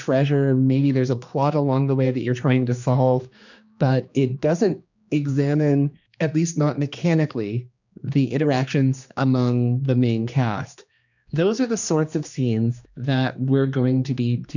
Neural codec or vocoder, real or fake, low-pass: codec, 16 kHz, 2 kbps, X-Codec, HuBERT features, trained on general audio; fake; 7.2 kHz